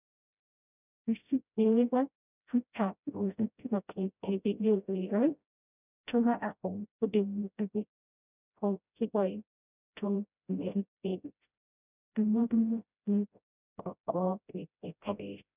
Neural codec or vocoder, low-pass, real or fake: codec, 16 kHz, 0.5 kbps, FreqCodec, smaller model; 3.6 kHz; fake